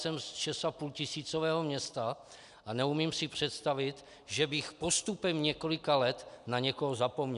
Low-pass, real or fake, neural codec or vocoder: 10.8 kHz; real; none